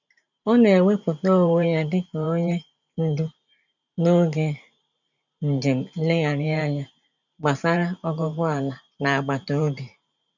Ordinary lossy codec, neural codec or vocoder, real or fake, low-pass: none; vocoder, 44.1 kHz, 128 mel bands every 512 samples, BigVGAN v2; fake; 7.2 kHz